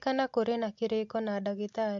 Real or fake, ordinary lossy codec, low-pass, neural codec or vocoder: real; MP3, 48 kbps; 7.2 kHz; none